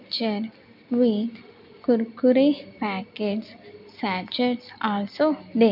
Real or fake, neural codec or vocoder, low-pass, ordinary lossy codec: real; none; 5.4 kHz; none